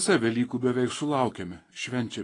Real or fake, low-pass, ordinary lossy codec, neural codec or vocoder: fake; 10.8 kHz; AAC, 32 kbps; autoencoder, 48 kHz, 128 numbers a frame, DAC-VAE, trained on Japanese speech